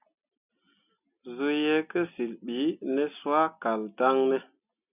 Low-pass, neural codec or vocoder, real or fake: 3.6 kHz; none; real